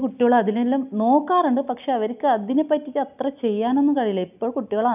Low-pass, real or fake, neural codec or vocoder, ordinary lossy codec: 3.6 kHz; real; none; none